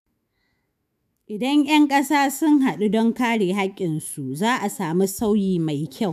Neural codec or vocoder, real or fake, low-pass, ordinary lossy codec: autoencoder, 48 kHz, 128 numbers a frame, DAC-VAE, trained on Japanese speech; fake; 14.4 kHz; none